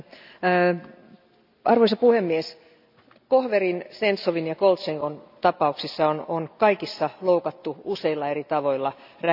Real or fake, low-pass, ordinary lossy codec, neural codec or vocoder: real; 5.4 kHz; none; none